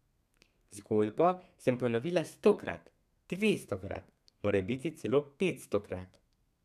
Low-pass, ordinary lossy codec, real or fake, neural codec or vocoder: 14.4 kHz; none; fake; codec, 32 kHz, 1.9 kbps, SNAC